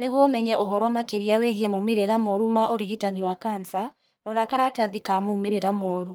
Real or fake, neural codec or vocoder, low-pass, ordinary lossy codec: fake; codec, 44.1 kHz, 1.7 kbps, Pupu-Codec; none; none